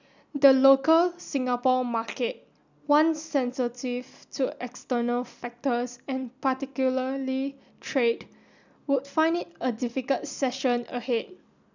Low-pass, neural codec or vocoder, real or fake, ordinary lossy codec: 7.2 kHz; none; real; none